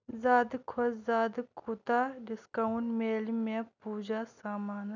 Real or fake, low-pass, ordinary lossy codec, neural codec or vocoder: real; 7.2 kHz; none; none